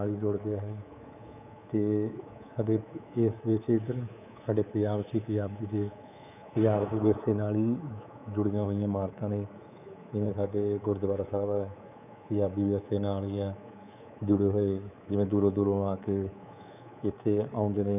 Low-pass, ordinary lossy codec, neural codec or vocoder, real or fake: 3.6 kHz; none; codec, 24 kHz, 3.1 kbps, DualCodec; fake